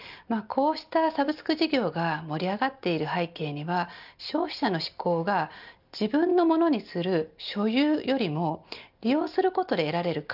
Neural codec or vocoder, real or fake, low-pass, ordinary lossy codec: none; real; 5.4 kHz; none